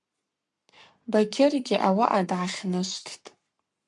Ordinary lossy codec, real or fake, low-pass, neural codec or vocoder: MP3, 96 kbps; fake; 10.8 kHz; codec, 44.1 kHz, 7.8 kbps, Pupu-Codec